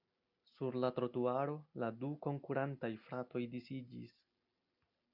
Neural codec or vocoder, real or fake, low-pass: none; real; 5.4 kHz